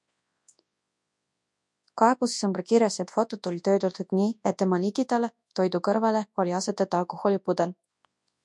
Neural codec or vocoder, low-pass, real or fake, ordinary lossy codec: codec, 24 kHz, 0.9 kbps, WavTokenizer, large speech release; 10.8 kHz; fake; MP3, 64 kbps